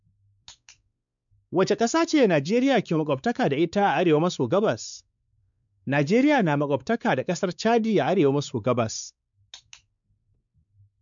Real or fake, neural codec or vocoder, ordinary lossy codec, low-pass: fake; codec, 16 kHz, 4 kbps, X-Codec, WavLM features, trained on Multilingual LibriSpeech; none; 7.2 kHz